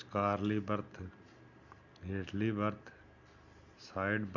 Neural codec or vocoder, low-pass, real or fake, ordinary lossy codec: none; 7.2 kHz; real; none